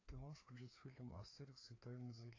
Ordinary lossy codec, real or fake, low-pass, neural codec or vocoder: AAC, 32 kbps; fake; 7.2 kHz; codec, 16 kHz, 2 kbps, FreqCodec, larger model